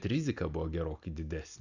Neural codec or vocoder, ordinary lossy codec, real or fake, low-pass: none; Opus, 64 kbps; real; 7.2 kHz